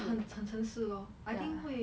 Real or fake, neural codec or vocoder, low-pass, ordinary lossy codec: real; none; none; none